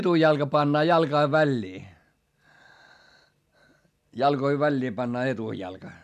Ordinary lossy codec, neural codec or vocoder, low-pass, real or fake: none; none; 14.4 kHz; real